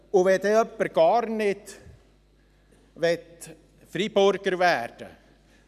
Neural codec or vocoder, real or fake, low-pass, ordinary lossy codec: none; real; 14.4 kHz; none